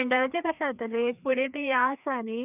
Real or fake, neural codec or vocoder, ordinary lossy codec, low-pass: fake; codec, 16 kHz, 2 kbps, FreqCodec, larger model; none; 3.6 kHz